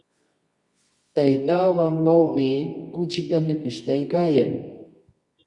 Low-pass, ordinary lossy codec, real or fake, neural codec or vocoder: 10.8 kHz; Opus, 64 kbps; fake; codec, 24 kHz, 0.9 kbps, WavTokenizer, medium music audio release